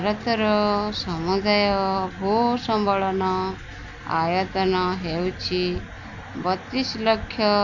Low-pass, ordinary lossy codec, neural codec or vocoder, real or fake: 7.2 kHz; none; none; real